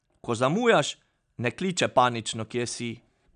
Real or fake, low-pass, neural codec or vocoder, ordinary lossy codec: real; 9.9 kHz; none; none